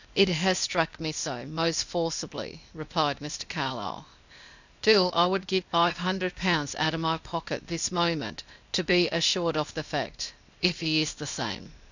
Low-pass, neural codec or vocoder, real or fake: 7.2 kHz; codec, 16 kHz, 0.8 kbps, ZipCodec; fake